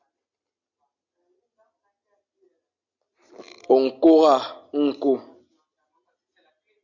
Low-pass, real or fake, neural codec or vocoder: 7.2 kHz; real; none